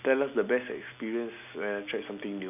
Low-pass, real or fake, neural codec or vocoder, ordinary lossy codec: 3.6 kHz; real; none; none